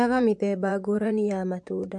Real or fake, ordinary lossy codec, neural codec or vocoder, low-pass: fake; MP3, 64 kbps; vocoder, 44.1 kHz, 128 mel bands, Pupu-Vocoder; 10.8 kHz